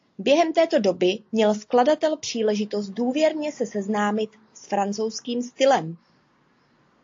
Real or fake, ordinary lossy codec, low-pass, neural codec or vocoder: real; AAC, 48 kbps; 7.2 kHz; none